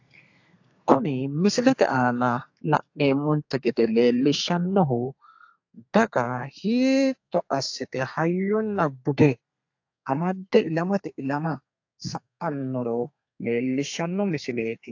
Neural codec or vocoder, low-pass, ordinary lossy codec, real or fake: codec, 32 kHz, 1.9 kbps, SNAC; 7.2 kHz; AAC, 48 kbps; fake